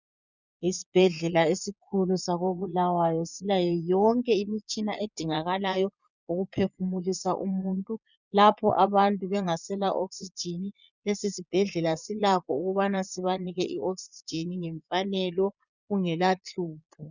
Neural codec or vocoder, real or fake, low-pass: vocoder, 22.05 kHz, 80 mel bands, Vocos; fake; 7.2 kHz